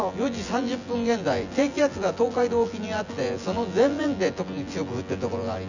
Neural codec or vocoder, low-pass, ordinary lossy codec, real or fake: vocoder, 24 kHz, 100 mel bands, Vocos; 7.2 kHz; none; fake